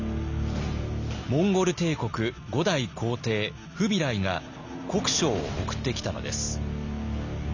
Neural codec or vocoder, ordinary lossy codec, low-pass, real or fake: none; none; 7.2 kHz; real